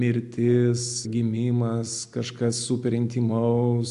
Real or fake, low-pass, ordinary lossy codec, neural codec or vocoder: real; 10.8 kHz; AAC, 64 kbps; none